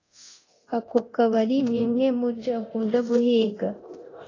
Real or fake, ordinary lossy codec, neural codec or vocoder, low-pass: fake; AAC, 32 kbps; codec, 24 kHz, 0.9 kbps, DualCodec; 7.2 kHz